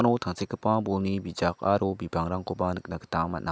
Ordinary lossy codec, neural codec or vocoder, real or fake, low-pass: none; none; real; none